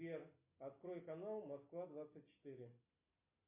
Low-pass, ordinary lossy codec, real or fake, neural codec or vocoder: 3.6 kHz; MP3, 32 kbps; real; none